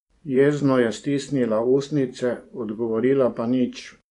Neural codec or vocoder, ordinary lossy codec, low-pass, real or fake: vocoder, 24 kHz, 100 mel bands, Vocos; MP3, 96 kbps; 10.8 kHz; fake